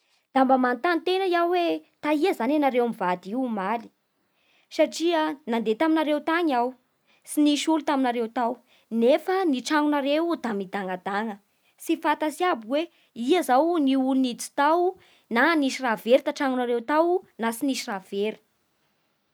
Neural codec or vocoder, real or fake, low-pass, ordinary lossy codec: none; real; none; none